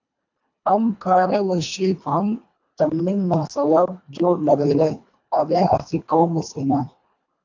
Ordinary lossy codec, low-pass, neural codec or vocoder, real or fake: AAC, 48 kbps; 7.2 kHz; codec, 24 kHz, 1.5 kbps, HILCodec; fake